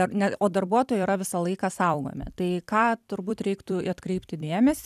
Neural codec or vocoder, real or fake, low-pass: vocoder, 44.1 kHz, 128 mel bands every 256 samples, BigVGAN v2; fake; 14.4 kHz